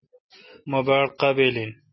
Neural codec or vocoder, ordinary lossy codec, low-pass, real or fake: none; MP3, 24 kbps; 7.2 kHz; real